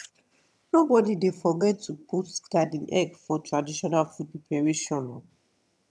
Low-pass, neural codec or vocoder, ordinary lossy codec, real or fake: none; vocoder, 22.05 kHz, 80 mel bands, HiFi-GAN; none; fake